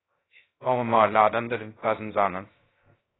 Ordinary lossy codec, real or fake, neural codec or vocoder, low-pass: AAC, 16 kbps; fake; codec, 16 kHz, 0.2 kbps, FocalCodec; 7.2 kHz